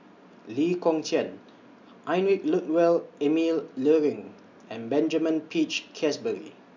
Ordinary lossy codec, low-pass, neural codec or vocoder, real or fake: MP3, 64 kbps; 7.2 kHz; none; real